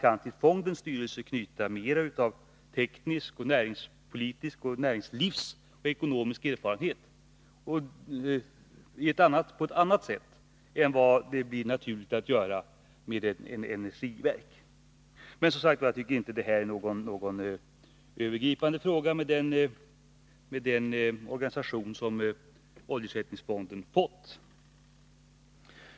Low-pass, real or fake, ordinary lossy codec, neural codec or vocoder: none; real; none; none